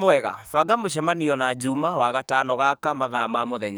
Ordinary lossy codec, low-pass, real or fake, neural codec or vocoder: none; none; fake; codec, 44.1 kHz, 2.6 kbps, SNAC